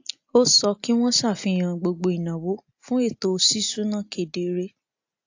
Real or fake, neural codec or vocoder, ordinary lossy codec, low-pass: real; none; AAC, 48 kbps; 7.2 kHz